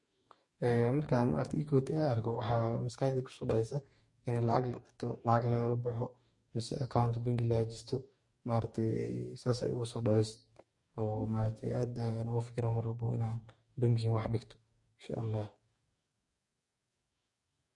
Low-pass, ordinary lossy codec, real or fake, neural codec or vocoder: 10.8 kHz; MP3, 48 kbps; fake; codec, 44.1 kHz, 2.6 kbps, DAC